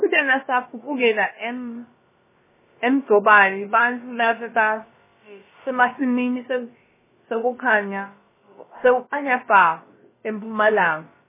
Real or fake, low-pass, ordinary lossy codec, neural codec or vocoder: fake; 3.6 kHz; MP3, 16 kbps; codec, 16 kHz, about 1 kbps, DyCAST, with the encoder's durations